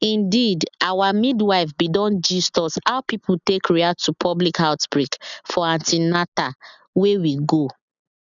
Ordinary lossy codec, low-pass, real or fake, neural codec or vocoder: none; 7.2 kHz; real; none